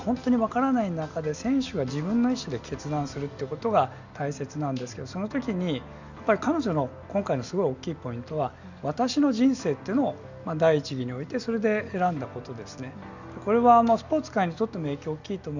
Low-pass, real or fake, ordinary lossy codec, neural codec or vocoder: 7.2 kHz; real; none; none